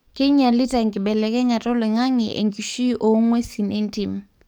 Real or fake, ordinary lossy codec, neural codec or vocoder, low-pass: fake; none; codec, 44.1 kHz, 7.8 kbps, DAC; 19.8 kHz